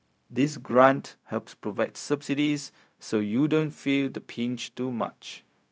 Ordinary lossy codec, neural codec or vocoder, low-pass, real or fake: none; codec, 16 kHz, 0.4 kbps, LongCat-Audio-Codec; none; fake